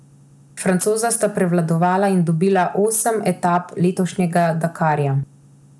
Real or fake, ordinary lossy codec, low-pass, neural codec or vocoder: real; none; none; none